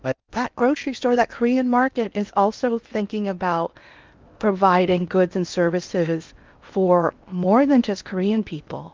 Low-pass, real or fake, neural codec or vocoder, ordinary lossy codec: 7.2 kHz; fake; codec, 16 kHz in and 24 kHz out, 0.8 kbps, FocalCodec, streaming, 65536 codes; Opus, 24 kbps